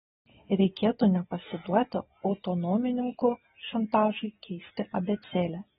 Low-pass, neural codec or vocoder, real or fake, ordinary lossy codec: 7.2 kHz; none; real; AAC, 16 kbps